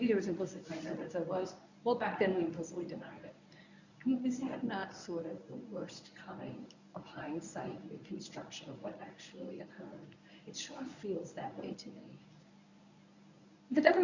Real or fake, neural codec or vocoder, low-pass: fake; codec, 24 kHz, 0.9 kbps, WavTokenizer, medium speech release version 1; 7.2 kHz